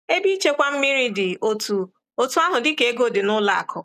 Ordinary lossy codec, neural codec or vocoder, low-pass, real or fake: none; none; 14.4 kHz; real